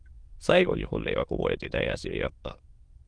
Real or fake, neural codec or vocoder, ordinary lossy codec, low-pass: fake; autoencoder, 22.05 kHz, a latent of 192 numbers a frame, VITS, trained on many speakers; Opus, 24 kbps; 9.9 kHz